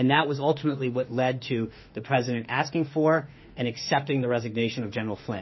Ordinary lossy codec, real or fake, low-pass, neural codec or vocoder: MP3, 24 kbps; fake; 7.2 kHz; autoencoder, 48 kHz, 32 numbers a frame, DAC-VAE, trained on Japanese speech